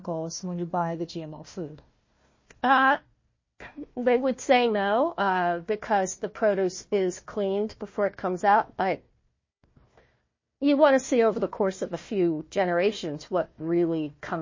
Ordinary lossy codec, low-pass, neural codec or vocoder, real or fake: MP3, 32 kbps; 7.2 kHz; codec, 16 kHz, 1 kbps, FunCodec, trained on Chinese and English, 50 frames a second; fake